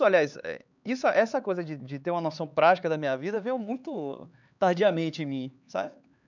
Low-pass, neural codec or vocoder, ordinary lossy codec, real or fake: 7.2 kHz; codec, 16 kHz, 4 kbps, X-Codec, HuBERT features, trained on LibriSpeech; none; fake